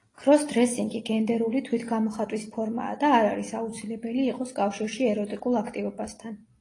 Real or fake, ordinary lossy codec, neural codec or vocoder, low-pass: real; AAC, 32 kbps; none; 10.8 kHz